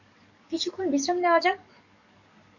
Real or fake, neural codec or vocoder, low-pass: fake; codec, 44.1 kHz, 7.8 kbps, DAC; 7.2 kHz